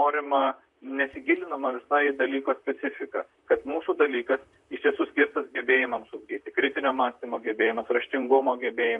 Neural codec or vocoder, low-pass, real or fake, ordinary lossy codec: vocoder, 44.1 kHz, 128 mel bands, Pupu-Vocoder; 10.8 kHz; fake; MP3, 64 kbps